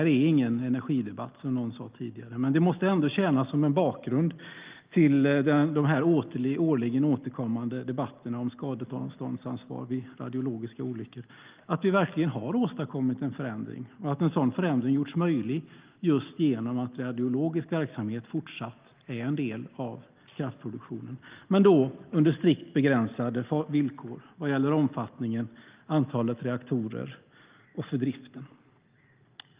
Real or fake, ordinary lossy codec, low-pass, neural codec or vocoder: real; Opus, 32 kbps; 3.6 kHz; none